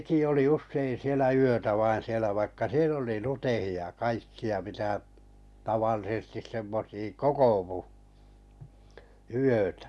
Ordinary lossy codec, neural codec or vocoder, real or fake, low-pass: none; none; real; none